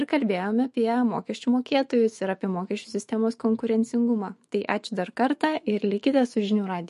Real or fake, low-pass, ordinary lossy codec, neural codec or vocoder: fake; 14.4 kHz; MP3, 48 kbps; autoencoder, 48 kHz, 128 numbers a frame, DAC-VAE, trained on Japanese speech